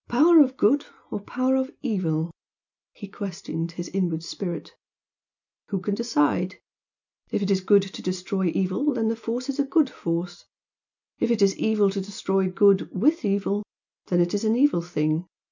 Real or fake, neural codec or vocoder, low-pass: real; none; 7.2 kHz